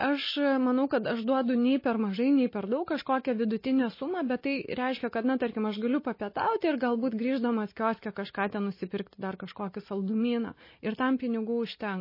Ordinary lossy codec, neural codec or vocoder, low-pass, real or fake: MP3, 24 kbps; none; 5.4 kHz; real